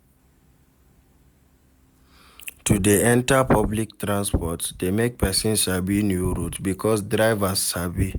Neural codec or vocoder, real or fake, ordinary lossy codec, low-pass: none; real; none; none